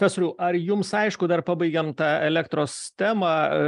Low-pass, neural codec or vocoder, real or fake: 10.8 kHz; none; real